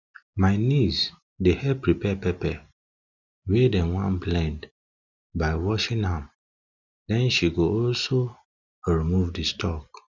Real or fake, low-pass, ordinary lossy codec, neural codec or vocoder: real; 7.2 kHz; none; none